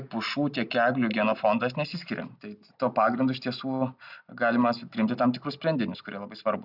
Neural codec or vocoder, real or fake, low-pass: none; real; 5.4 kHz